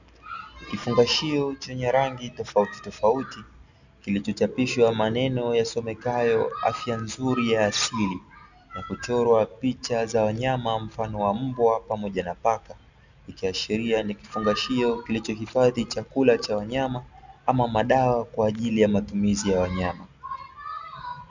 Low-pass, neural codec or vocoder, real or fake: 7.2 kHz; none; real